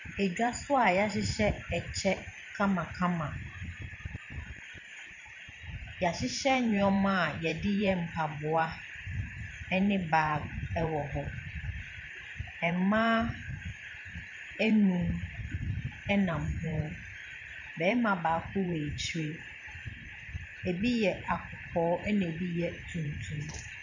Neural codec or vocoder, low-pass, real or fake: vocoder, 44.1 kHz, 128 mel bands every 512 samples, BigVGAN v2; 7.2 kHz; fake